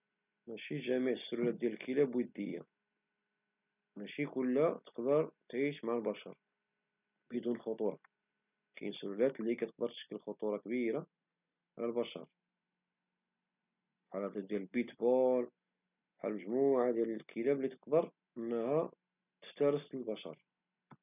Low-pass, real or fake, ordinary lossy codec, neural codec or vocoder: 3.6 kHz; real; none; none